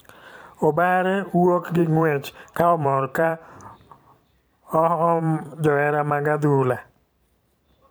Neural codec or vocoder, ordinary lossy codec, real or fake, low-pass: vocoder, 44.1 kHz, 128 mel bands every 512 samples, BigVGAN v2; none; fake; none